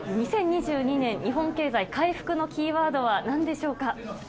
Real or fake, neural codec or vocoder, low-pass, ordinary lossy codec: real; none; none; none